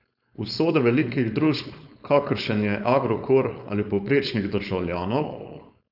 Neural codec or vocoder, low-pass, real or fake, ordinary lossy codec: codec, 16 kHz, 4.8 kbps, FACodec; 5.4 kHz; fake; none